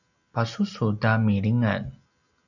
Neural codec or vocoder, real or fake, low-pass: none; real; 7.2 kHz